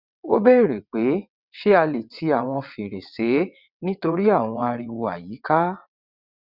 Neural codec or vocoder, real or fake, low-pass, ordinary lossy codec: vocoder, 22.05 kHz, 80 mel bands, WaveNeXt; fake; 5.4 kHz; Opus, 64 kbps